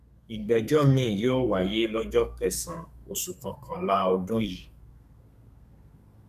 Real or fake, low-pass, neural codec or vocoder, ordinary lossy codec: fake; 14.4 kHz; codec, 32 kHz, 1.9 kbps, SNAC; none